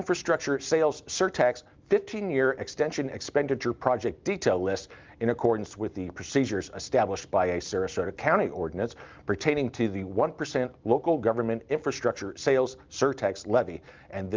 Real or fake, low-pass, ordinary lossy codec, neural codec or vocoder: real; 7.2 kHz; Opus, 32 kbps; none